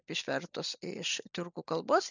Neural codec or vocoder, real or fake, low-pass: none; real; 7.2 kHz